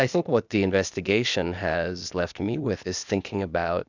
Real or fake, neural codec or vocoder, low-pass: fake; codec, 16 kHz, 0.8 kbps, ZipCodec; 7.2 kHz